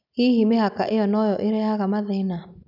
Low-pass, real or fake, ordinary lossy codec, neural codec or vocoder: 5.4 kHz; real; none; none